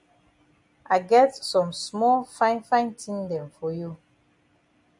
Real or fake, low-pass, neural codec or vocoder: real; 10.8 kHz; none